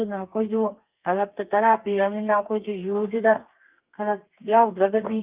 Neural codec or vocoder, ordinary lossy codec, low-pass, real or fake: codec, 32 kHz, 1.9 kbps, SNAC; Opus, 24 kbps; 3.6 kHz; fake